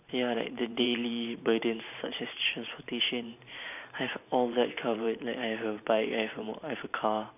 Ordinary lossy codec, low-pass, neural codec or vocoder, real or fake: none; 3.6 kHz; vocoder, 44.1 kHz, 128 mel bands every 512 samples, BigVGAN v2; fake